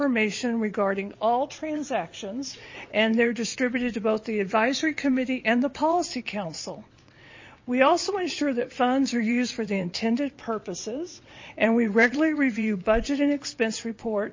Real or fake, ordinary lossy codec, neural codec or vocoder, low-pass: fake; MP3, 32 kbps; vocoder, 22.05 kHz, 80 mel bands, WaveNeXt; 7.2 kHz